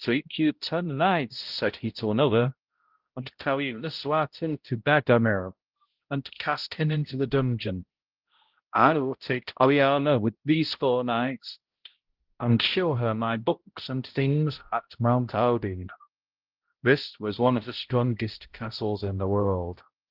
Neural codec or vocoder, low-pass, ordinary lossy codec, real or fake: codec, 16 kHz, 0.5 kbps, X-Codec, HuBERT features, trained on balanced general audio; 5.4 kHz; Opus, 16 kbps; fake